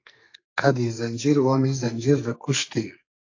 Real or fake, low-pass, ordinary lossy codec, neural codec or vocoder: fake; 7.2 kHz; AAC, 48 kbps; codec, 44.1 kHz, 2.6 kbps, SNAC